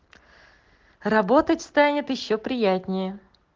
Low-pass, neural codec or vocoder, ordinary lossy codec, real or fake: 7.2 kHz; none; Opus, 16 kbps; real